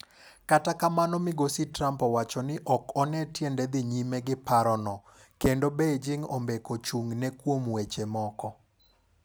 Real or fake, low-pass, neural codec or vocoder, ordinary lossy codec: real; none; none; none